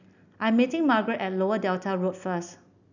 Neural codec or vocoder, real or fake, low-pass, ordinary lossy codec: none; real; 7.2 kHz; none